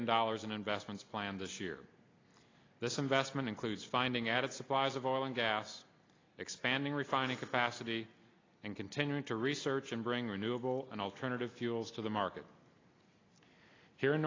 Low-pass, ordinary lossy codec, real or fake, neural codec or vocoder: 7.2 kHz; AAC, 32 kbps; real; none